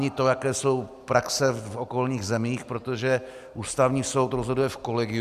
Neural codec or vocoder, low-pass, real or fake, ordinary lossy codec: none; 14.4 kHz; real; AAC, 96 kbps